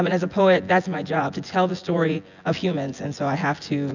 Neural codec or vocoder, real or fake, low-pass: vocoder, 24 kHz, 100 mel bands, Vocos; fake; 7.2 kHz